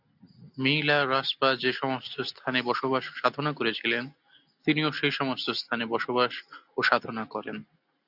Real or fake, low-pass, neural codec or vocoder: real; 5.4 kHz; none